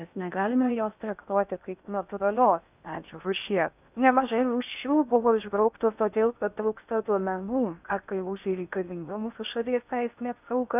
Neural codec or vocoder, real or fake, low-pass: codec, 16 kHz in and 24 kHz out, 0.6 kbps, FocalCodec, streaming, 2048 codes; fake; 3.6 kHz